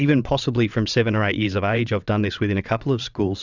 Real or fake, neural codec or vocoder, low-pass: fake; vocoder, 22.05 kHz, 80 mel bands, WaveNeXt; 7.2 kHz